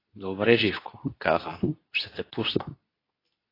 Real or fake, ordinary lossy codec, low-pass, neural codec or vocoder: fake; AAC, 24 kbps; 5.4 kHz; codec, 24 kHz, 0.9 kbps, WavTokenizer, medium speech release version 2